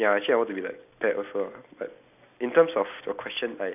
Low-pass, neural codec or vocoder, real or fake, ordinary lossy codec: 3.6 kHz; none; real; none